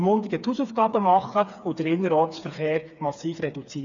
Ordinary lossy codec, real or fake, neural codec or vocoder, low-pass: none; fake; codec, 16 kHz, 4 kbps, FreqCodec, smaller model; 7.2 kHz